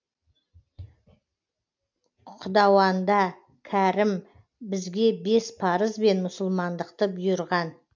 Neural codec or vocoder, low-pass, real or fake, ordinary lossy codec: none; 7.2 kHz; real; MP3, 64 kbps